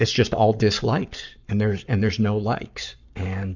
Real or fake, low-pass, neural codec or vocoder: fake; 7.2 kHz; codec, 44.1 kHz, 7.8 kbps, Pupu-Codec